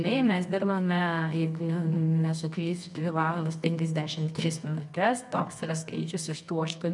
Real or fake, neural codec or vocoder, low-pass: fake; codec, 24 kHz, 0.9 kbps, WavTokenizer, medium music audio release; 10.8 kHz